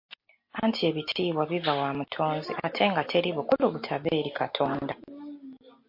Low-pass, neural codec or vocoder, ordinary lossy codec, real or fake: 5.4 kHz; none; MP3, 32 kbps; real